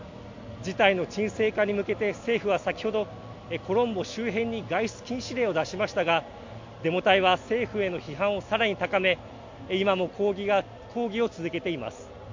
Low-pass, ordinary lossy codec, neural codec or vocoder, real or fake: 7.2 kHz; MP3, 48 kbps; none; real